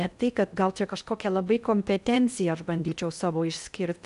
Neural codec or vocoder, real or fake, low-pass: codec, 16 kHz in and 24 kHz out, 0.6 kbps, FocalCodec, streaming, 4096 codes; fake; 10.8 kHz